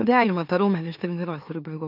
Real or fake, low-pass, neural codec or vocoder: fake; 5.4 kHz; autoencoder, 44.1 kHz, a latent of 192 numbers a frame, MeloTTS